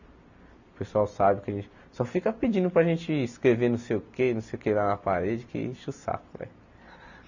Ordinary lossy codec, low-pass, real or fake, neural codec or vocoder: none; 7.2 kHz; real; none